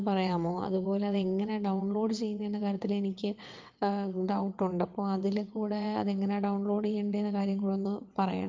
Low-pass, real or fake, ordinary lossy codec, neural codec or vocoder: 7.2 kHz; fake; Opus, 32 kbps; vocoder, 22.05 kHz, 80 mel bands, WaveNeXt